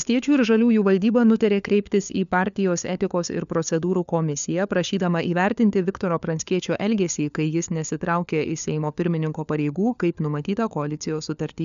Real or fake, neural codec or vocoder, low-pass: fake; codec, 16 kHz, 4 kbps, FunCodec, trained on LibriTTS, 50 frames a second; 7.2 kHz